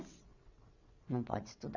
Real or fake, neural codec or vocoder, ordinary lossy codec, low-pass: fake; vocoder, 22.05 kHz, 80 mel bands, WaveNeXt; none; 7.2 kHz